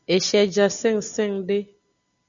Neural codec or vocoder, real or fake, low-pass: none; real; 7.2 kHz